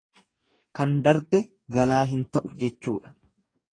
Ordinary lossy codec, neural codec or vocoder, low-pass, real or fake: AAC, 32 kbps; codec, 44.1 kHz, 2.6 kbps, DAC; 9.9 kHz; fake